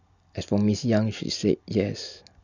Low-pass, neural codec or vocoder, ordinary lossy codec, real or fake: 7.2 kHz; none; none; real